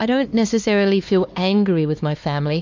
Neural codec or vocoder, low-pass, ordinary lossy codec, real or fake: codec, 16 kHz, 2 kbps, X-Codec, HuBERT features, trained on LibriSpeech; 7.2 kHz; MP3, 48 kbps; fake